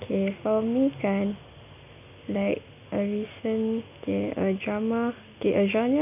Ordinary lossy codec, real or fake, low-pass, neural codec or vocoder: none; real; 3.6 kHz; none